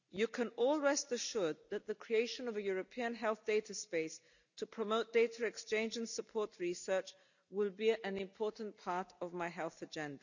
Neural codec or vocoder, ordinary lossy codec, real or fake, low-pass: none; none; real; 7.2 kHz